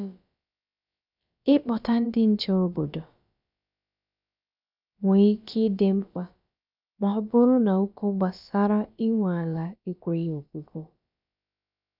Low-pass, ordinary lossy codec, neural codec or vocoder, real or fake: 5.4 kHz; none; codec, 16 kHz, about 1 kbps, DyCAST, with the encoder's durations; fake